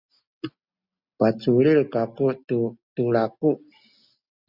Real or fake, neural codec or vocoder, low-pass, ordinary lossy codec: real; none; 5.4 kHz; Opus, 64 kbps